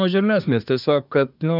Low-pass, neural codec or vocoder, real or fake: 5.4 kHz; codec, 24 kHz, 1 kbps, SNAC; fake